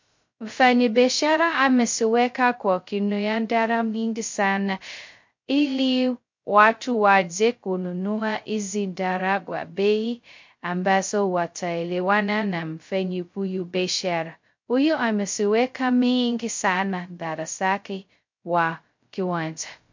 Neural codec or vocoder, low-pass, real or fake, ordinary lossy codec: codec, 16 kHz, 0.2 kbps, FocalCodec; 7.2 kHz; fake; MP3, 48 kbps